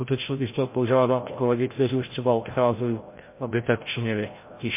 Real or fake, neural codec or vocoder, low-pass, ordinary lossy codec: fake; codec, 16 kHz, 0.5 kbps, FreqCodec, larger model; 3.6 kHz; MP3, 24 kbps